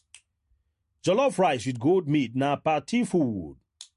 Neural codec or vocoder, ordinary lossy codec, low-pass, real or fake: none; MP3, 48 kbps; 10.8 kHz; real